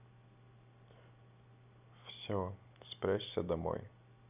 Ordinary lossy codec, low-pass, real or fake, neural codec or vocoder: none; 3.6 kHz; real; none